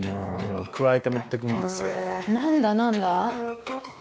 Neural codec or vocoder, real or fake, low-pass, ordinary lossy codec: codec, 16 kHz, 2 kbps, X-Codec, WavLM features, trained on Multilingual LibriSpeech; fake; none; none